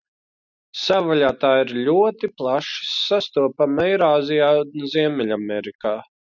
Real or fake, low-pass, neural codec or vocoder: real; 7.2 kHz; none